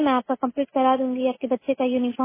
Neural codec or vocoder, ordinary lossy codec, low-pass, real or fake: none; MP3, 16 kbps; 3.6 kHz; real